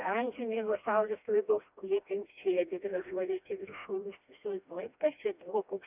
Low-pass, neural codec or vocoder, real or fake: 3.6 kHz; codec, 16 kHz, 1 kbps, FreqCodec, smaller model; fake